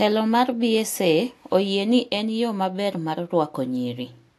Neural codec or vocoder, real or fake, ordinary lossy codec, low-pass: none; real; AAC, 64 kbps; 14.4 kHz